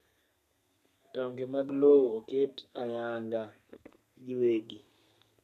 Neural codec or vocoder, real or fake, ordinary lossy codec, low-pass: codec, 32 kHz, 1.9 kbps, SNAC; fake; none; 14.4 kHz